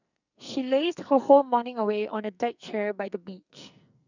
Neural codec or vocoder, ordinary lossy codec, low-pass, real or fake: codec, 44.1 kHz, 2.6 kbps, SNAC; none; 7.2 kHz; fake